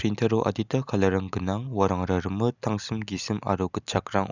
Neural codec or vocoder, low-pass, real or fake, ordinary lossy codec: none; 7.2 kHz; real; none